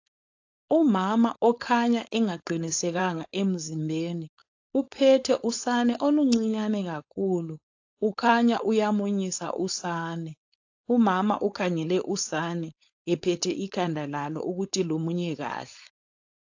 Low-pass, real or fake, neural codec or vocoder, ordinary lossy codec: 7.2 kHz; fake; codec, 16 kHz, 4.8 kbps, FACodec; AAC, 48 kbps